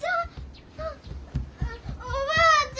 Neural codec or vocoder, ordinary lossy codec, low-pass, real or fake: none; none; none; real